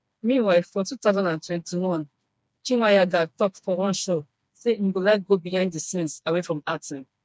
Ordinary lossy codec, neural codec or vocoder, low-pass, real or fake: none; codec, 16 kHz, 2 kbps, FreqCodec, smaller model; none; fake